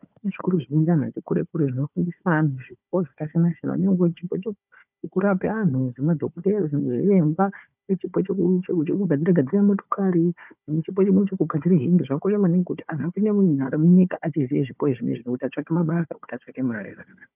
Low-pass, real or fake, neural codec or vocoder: 3.6 kHz; fake; codec, 16 kHz, 4 kbps, FunCodec, trained on Chinese and English, 50 frames a second